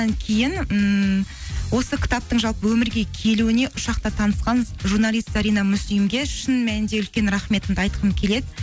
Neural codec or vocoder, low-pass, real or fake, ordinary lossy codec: none; none; real; none